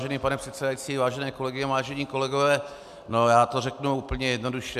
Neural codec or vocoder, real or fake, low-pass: none; real; 14.4 kHz